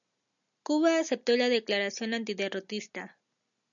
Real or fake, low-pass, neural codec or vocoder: real; 7.2 kHz; none